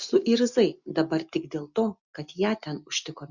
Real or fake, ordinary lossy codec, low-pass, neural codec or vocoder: real; Opus, 64 kbps; 7.2 kHz; none